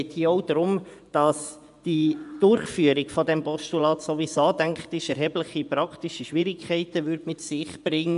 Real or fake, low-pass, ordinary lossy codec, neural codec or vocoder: fake; 10.8 kHz; none; vocoder, 24 kHz, 100 mel bands, Vocos